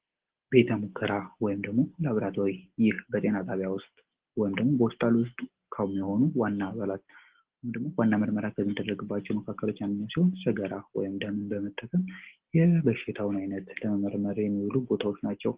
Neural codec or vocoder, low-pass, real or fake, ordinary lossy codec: none; 3.6 kHz; real; Opus, 16 kbps